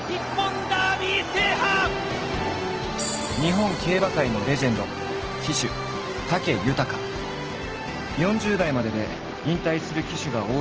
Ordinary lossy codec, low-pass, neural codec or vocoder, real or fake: Opus, 16 kbps; 7.2 kHz; none; real